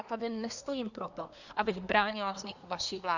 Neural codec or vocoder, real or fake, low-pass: codec, 24 kHz, 1 kbps, SNAC; fake; 7.2 kHz